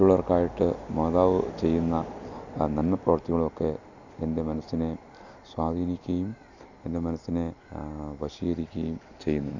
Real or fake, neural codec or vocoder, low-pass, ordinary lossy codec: real; none; 7.2 kHz; none